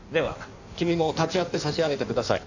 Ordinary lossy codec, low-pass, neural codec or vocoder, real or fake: none; 7.2 kHz; codec, 16 kHz in and 24 kHz out, 1.1 kbps, FireRedTTS-2 codec; fake